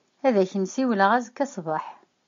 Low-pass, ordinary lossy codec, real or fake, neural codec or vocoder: 7.2 kHz; MP3, 48 kbps; real; none